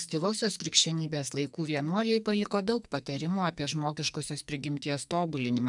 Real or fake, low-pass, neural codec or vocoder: fake; 10.8 kHz; codec, 44.1 kHz, 2.6 kbps, SNAC